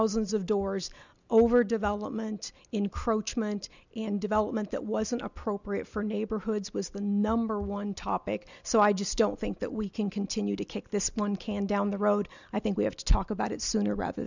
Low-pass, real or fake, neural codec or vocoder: 7.2 kHz; real; none